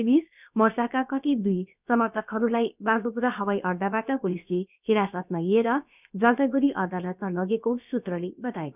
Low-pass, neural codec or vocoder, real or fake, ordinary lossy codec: 3.6 kHz; codec, 16 kHz, about 1 kbps, DyCAST, with the encoder's durations; fake; none